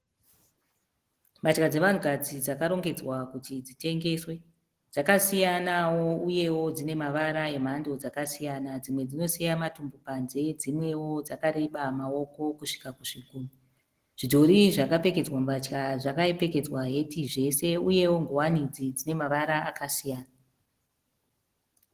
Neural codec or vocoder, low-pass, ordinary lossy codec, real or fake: none; 14.4 kHz; Opus, 16 kbps; real